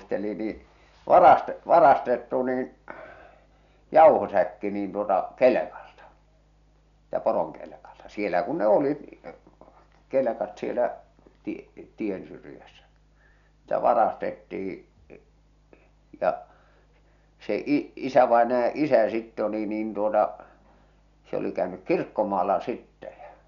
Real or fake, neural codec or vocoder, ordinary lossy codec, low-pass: real; none; none; 7.2 kHz